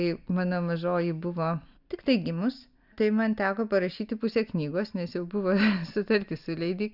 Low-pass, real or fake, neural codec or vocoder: 5.4 kHz; real; none